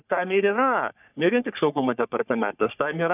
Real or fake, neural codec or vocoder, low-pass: fake; codec, 44.1 kHz, 3.4 kbps, Pupu-Codec; 3.6 kHz